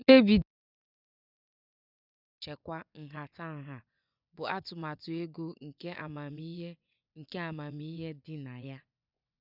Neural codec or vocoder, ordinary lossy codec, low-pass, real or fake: vocoder, 24 kHz, 100 mel bands, Vocos; none; 5.4 kHz; fake